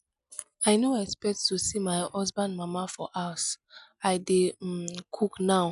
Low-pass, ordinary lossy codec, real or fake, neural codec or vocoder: 10.8 kHz; none; real; none